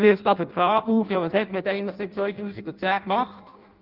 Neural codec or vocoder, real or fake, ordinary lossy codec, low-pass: codec, 16 kHz in and 24 kHz out, 0.6 kbps, FireRedTTS-2 codec; fake; Opus, 32 kbps; 5.4 kHz